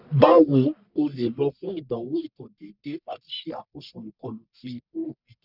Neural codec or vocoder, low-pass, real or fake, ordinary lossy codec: codec, 44.1 kHz, 1.7 kbps, Pupu-Codec; 5.4 kHz; fake; none